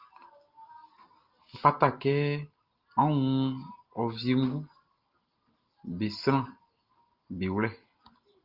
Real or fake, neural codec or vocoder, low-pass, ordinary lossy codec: real; none; 5.4 kHz; Opus, 32 kbps